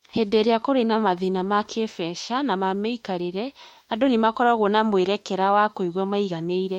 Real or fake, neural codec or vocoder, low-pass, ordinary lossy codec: fake; autoencoder, 48 kHz, 32 numbers a frame, DAC-VAE, trained on Japanese speech; 19.8 kHz; MP3, 64 kbps